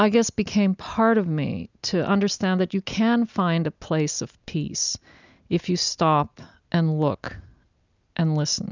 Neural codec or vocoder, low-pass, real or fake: none; 7.2 kHz; real